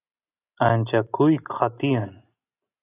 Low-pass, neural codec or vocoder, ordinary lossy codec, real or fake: 3.6 kHz; none; AAC, 24 kbps; real